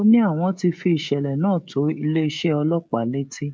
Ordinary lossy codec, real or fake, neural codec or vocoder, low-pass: none; fake; codec, 16 kHz, 16 kbps, FreqCodec, smaller model; none